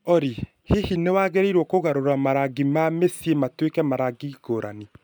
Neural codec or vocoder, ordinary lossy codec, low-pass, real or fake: none; none; none; real